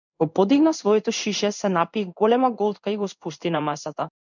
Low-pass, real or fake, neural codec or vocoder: 7.2 kHz; fake; codec, 16 kHz in and 24 kHz out, 1 kbps, XY-Tokenizer